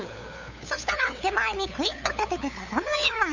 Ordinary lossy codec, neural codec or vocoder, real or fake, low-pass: none; codec, 16 kHz, 8 kbps, FunCodec, trained on LibriTTS, 25 frames a second; fake; 7.2 kHz